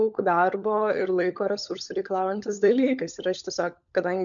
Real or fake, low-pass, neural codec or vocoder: fake; 7.2 kHz; codec, 16 kHz, 16 kbps, FunCodec, trained on LibriTTS, 50 frames a second